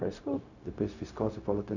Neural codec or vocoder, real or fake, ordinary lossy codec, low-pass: codec, 16 kHz, 0.4 kbps, LongCat-Audio-Codec; fake; none; 7.2 kHz